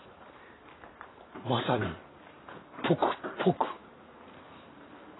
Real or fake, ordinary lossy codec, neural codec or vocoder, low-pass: fake; AAC, 16 kbps; vocoder, 44.1 kHz, 128 mel bands every 512 samples, BigVGAN v2; 7.2 kHz